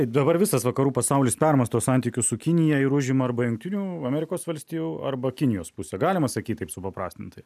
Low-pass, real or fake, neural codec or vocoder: 14.4 kHz; real; none